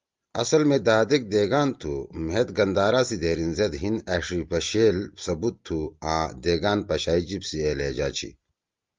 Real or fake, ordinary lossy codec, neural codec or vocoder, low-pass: real; Opus, 32 kbps; none; 7.2 kHz